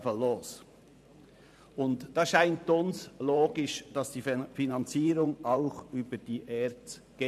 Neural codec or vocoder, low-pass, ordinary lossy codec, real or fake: vocoder, 44.1 kHz, 128 mel bands every 512 samples, BigVGAN v2; 14.4 kHz; none; fake